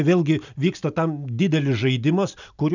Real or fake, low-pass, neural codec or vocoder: real; 7.2 kHz; none